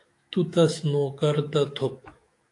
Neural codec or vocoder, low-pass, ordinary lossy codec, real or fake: autoencoder, 48 kHz, 128 numbers a frame, DAC-VAE, trained on Japanese speech; 10.8 kHz; AAC, 48 kbps; fake